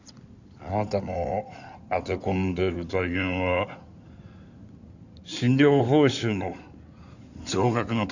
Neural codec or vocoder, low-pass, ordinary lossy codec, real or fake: codec, 16 kHz in and 24 kHz out, 2.2 kbps, FireRedTTS-2 codec; 7.2 kHz; none; fake